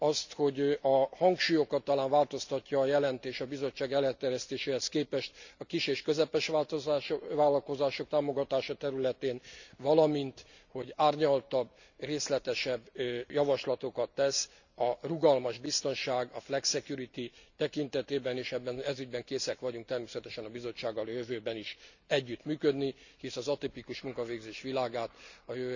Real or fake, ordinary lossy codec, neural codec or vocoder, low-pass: real; none; none; 7.2 kHz